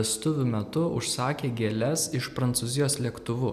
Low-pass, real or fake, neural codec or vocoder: 14.4 kHz; real; none